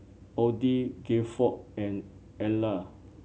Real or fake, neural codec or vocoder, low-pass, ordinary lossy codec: real; none; none; none